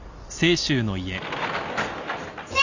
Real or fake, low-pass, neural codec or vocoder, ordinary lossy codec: real; 7.2 kHz; none; none